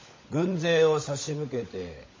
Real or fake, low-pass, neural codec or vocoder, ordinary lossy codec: fake; 7.2 kHz; codec, 16 kHz, 16 kbps, FunCodec, trained on LibriTTS, 50 frames a second; MP3, 32 kbps